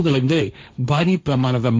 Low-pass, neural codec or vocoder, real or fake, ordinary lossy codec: none; codec, 16 kHz, 1.1 kbps, Voila-Tokenizer; fake; none